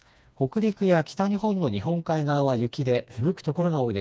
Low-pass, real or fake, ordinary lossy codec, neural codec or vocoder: none; fake; none; codec, 16 kHz, 2 kbps, FreqCodec, smaller model